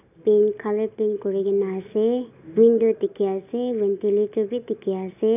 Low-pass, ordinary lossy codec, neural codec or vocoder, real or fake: 3.6 kHz; none; none; real